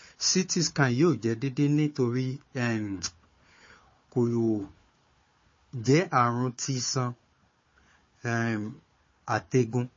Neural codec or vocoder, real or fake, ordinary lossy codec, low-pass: codec, 16 kHz, 4 kbps, FunCodec, trained on Chinese and English, 50 frames a second; fake; MP3, 32 kbps; 7.2 kHz